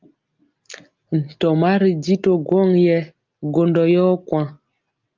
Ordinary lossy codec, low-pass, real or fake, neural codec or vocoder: Opus, 24 kbps; 7.2 kHz; real; none